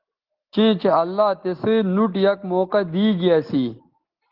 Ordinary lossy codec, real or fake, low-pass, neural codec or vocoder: Opus, 16 kbps; real; 5.4 kHz; none